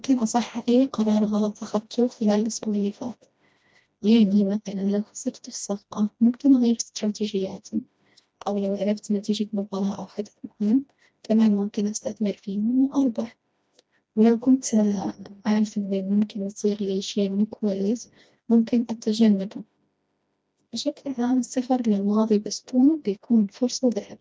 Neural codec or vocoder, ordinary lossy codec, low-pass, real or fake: codec, 16 kHz, 1 kbps, FreqCodec, smaller model; none; none; fake